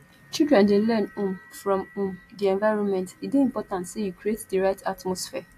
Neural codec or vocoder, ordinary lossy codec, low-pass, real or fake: none; AAC, 64 kbps; 14.4 kHz; real